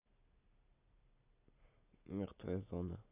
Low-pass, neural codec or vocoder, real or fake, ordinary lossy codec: 3.6 kHz; none; real; AAC, 32 kbps